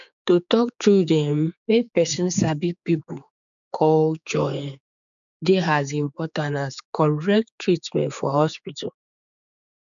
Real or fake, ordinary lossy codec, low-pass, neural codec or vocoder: fake; none; 7.2 kHz; codec, 16 kHz, 4 kbps, X-Codec, HuBERT features, trained on general audio